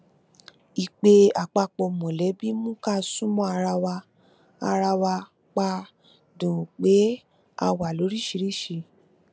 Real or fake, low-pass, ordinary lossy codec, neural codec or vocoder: real; none; none; none